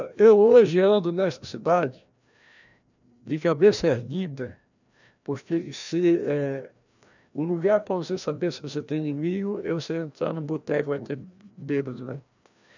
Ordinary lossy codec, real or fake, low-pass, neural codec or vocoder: none; fake; 7.2 kHz; codec, 16 kHz, 1 kbps, FreqCodec, larger model